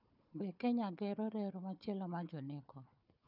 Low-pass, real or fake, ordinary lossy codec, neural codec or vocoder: 5.4 kHz; fake; none; codec, 16 kHz, 4 kbps, FunCodec, trained on Chinese and English, 50 frames a second